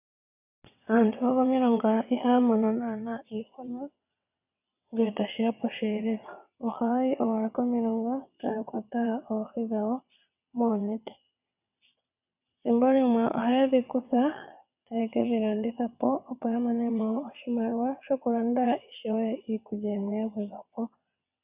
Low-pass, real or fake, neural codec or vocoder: 3.6 kHz; fake; vocoder, 22.05 kHz, 80 mel bands, WaveNeXt